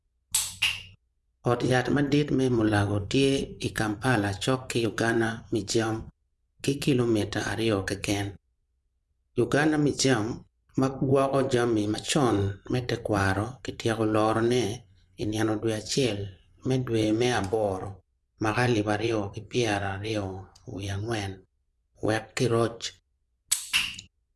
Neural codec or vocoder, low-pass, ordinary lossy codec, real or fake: vocoder, 24 kHz, 100 mel bands, Vocos; none; none; fake